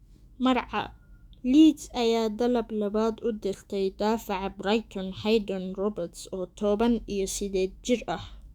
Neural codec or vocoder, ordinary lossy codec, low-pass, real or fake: autoencoder, 48 kHz, 128 numbers a frame, DAC-VAE, trained on Japanese speech; Opus, 64 kbps; 19.8 kHz; fake